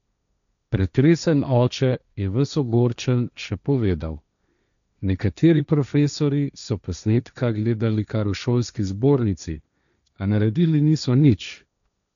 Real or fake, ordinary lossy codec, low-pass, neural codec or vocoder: fake; none; 7.2 kHz; codec, 16 kHz, 1.1 kbps, Voila-Tokenizer